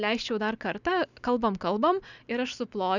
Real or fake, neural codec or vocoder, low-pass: real; none; 7.2 kHz